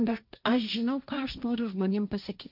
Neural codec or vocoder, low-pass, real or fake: codec, 16 kHz, 1.1 kbps, Voila-Tokenizer; 5.4 kHz; fake